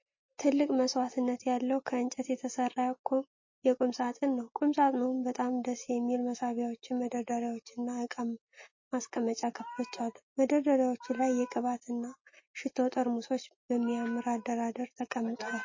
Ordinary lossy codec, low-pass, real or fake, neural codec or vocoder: MP3, 32 kbps; 7.2 kHz; real; none